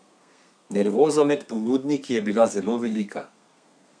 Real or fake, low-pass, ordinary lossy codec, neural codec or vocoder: fake; 9.9 kHz; none; codec, 32 kHz, 1.9 kbps, SNAC